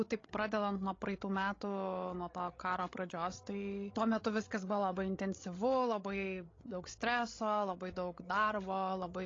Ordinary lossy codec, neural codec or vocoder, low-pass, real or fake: AAC, 32 kbps; codec, 16 kHz, 16 kbps, FreqCodec, larger model; 7.2 kHz; fake